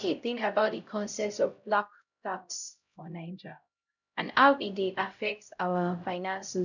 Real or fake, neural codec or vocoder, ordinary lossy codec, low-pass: fake; codec, 16 kHz, 0.5 kbps, X-Codec, HuBERT features, trained on LibriSpeech; none; 7.2 kHz